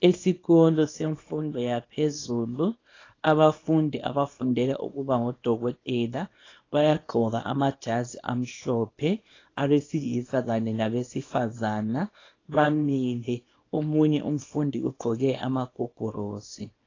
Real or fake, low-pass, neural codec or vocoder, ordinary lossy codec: fake; 7.2 kHz; codec, 24 kHz, 0.9 kbps, WavTokenizer, small release; AAC, 32 kbps